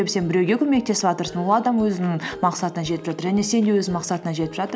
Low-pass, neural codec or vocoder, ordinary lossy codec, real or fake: none; none; none; real